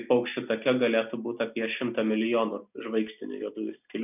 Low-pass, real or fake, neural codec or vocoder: 3.6 kHz; real; none